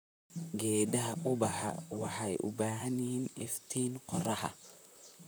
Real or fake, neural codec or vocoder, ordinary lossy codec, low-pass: fake; vocoder, 44.1 kHz, 128 mel bands, Pupu-Vocoder; none; none